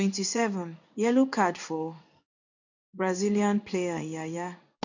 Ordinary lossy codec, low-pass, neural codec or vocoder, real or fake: none; 7.2 kHz; codec, 16 kHz in and 24 kHz out, 1 kbps, XY-Tokenizer; fake